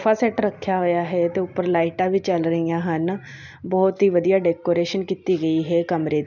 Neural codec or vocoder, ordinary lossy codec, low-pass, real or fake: none; none; 7.2 kHz; real